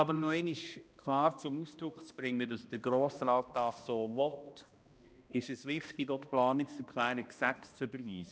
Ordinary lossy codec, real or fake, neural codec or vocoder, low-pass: none; fake; codec, 16 kHz, 1 kbps, X-Codec, HuBERT features, trained on balanced general audio; none